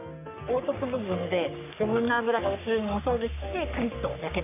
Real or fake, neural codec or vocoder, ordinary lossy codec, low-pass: fake; codec, 44.1 kHz, 3.4 kbps, Pupu-Codec; none; 3.6 kHz